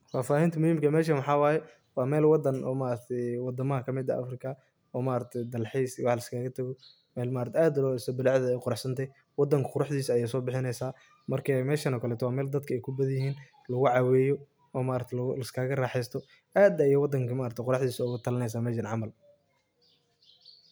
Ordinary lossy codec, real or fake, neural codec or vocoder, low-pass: none; real; none; none